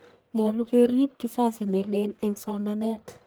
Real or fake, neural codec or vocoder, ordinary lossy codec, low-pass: fake; codec, 44.1 kHz, 1.7 kbps, Pupu-Codec; none; none